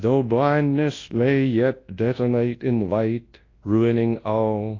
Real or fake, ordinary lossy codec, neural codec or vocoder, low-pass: fake; AAC, 32 kbps; codec, 24 kHz, 0.9 kbps, WavTokenizer, large speech release; 7.2 kHz